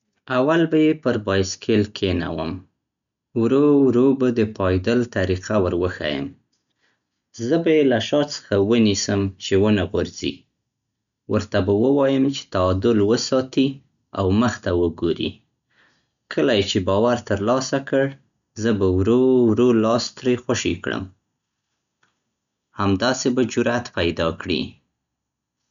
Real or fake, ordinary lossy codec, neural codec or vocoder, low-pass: real; none; none; 7.2 kHz